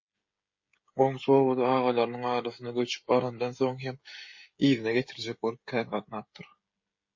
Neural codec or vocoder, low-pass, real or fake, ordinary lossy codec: codec, 16 kHz, 16 kbps, FreqCodec, smaller model; 7.2 kHz; fake; MP3, 32 kbps